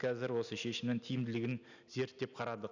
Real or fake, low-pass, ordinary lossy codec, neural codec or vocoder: real; 7.2 kHz; none; none